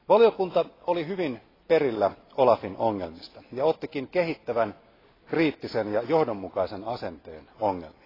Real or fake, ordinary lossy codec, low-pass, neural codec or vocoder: real; AAC, 24 kbps; 5.4 kHz; none